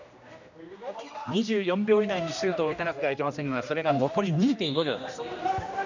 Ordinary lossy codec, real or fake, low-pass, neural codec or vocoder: none; fake; 7.2 kHz; codec, 16 kHz, 1 kbps, X-Codec, HuBERT features, trained on general audio